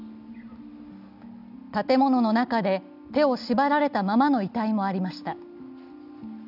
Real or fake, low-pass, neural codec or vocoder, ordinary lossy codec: real; 5.4 kHz; none; none